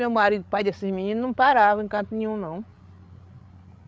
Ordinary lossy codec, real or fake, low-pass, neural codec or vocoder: none; fake; none; codec, 16 kHz, 16 kbps, FreqCodec, larger model